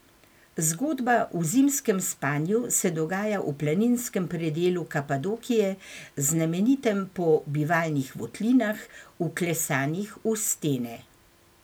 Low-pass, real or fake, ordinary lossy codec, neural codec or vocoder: none; real; none; none